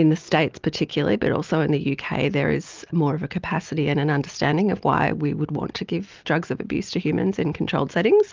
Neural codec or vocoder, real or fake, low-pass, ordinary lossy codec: none; real; 7.2 kHz; Opus, 24 kbps